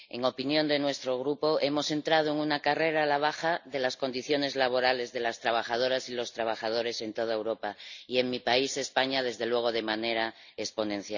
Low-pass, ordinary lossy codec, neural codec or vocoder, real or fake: 7.2 kHz; none; none; real